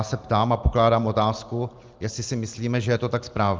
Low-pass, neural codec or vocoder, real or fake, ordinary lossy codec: 7.2 kHz; none; real; Opus, 32 kbps